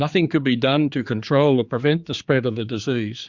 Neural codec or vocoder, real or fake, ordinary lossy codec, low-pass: codec, 16 kHz, 4 kbps, X-Codec, HuBERT features, trained on general audio; fake; Opus, 64 kbps; 7.2 kHz